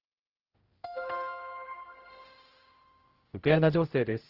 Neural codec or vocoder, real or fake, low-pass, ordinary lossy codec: codec, 16 kHz, 0.5 kbps, X-Codec, HuBERT features, trained on general audio; fake; 5.4 kHz; Opus, 16 kbps